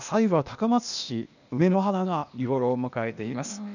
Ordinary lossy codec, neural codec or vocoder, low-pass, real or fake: none; codec, 16 kHz, 0.8 kbps, ZipCodec; 7.2 kHz; fake